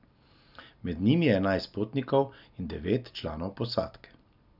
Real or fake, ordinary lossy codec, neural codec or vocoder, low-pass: real; none; none; 5.4 kHz